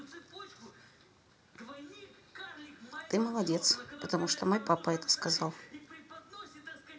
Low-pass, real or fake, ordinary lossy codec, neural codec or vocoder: none; real; none; none